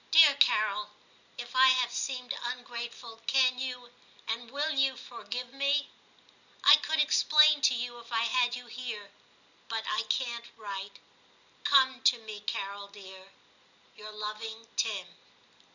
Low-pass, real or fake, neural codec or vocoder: 7.2 kHz; real; none